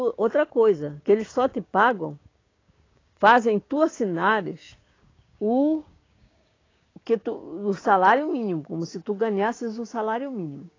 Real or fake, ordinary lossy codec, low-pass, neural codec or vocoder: fake; AAC, 32 kbps; 7.2 kHz; codec, 16 kHz, 6 kbps, DAC